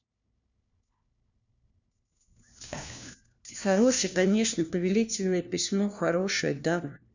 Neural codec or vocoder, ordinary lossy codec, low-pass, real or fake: codec, 16 kHz, 1 kbps, FunCodec, trained on LibriTTS, 50 frames a second; none; 7.2 kHz; fake